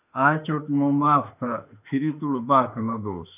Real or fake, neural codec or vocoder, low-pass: fake; autoencoder, 48 kHz, 32 numbers a frame, DAC-VAE, trained on Japanese speech; 3.6 kHz